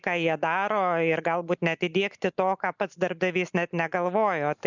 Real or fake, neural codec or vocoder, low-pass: real; none; 7.2 kHz